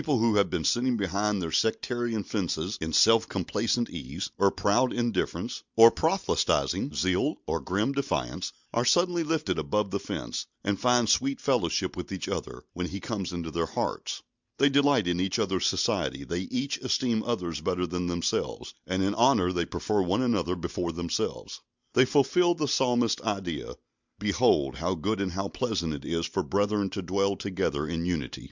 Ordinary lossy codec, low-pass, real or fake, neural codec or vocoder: Opus, 64 kbps; 7.2 kHz; real; none